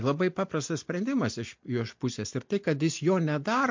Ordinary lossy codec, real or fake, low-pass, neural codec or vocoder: MP3, 48 kbps; real; 7.2 kHz; none